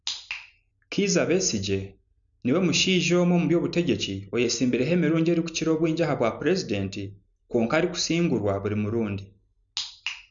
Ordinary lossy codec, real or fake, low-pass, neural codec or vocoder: none; real; 7.2 kHz; none